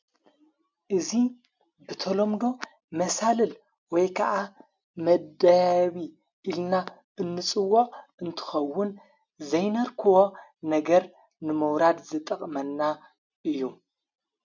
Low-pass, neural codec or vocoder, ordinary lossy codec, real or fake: 7.2 kHz; none; MP3, 64 kbps; real